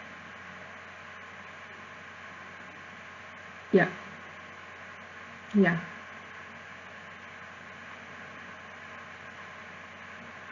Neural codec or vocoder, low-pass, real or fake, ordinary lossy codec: none; 7.2 kHz; real; Opus, 64 kbps